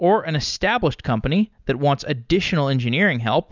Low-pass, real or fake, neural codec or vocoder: 7.2 kHz; real; none